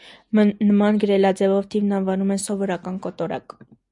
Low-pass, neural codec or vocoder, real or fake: 10.8 kHz; none; real